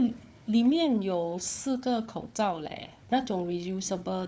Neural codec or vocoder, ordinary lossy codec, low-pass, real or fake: codec, 16 kHz, 4 kbps, FunCodec, trained on Chinese and English, 50 frames a second; none; none; fake